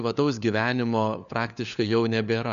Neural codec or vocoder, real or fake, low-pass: codec, 16 kHz, 4 kbps, FunCodec, trained on LibriTTS, 50 frames a second; fake; 7.2 kHz